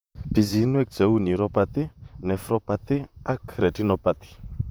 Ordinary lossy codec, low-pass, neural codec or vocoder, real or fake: none; none; vocoder, 44.1 kHz, 128 mel bands, Pupu-Vocoder; fake